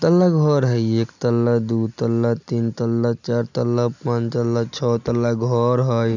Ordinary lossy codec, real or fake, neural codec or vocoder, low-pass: none; real; none; 7.2 kHz